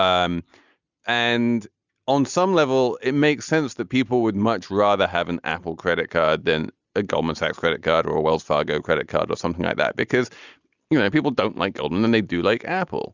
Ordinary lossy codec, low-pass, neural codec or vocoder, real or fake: Opus, 64 kbps; 7.2 kHz; none; real